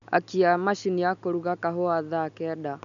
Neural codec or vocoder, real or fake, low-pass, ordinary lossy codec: none; real; 7.2 kHz; none